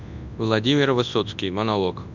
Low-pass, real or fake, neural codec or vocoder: 7.2 kHz; fake; codec, 24 kHz, 0.9 kbps, WavTokenizer, large speech release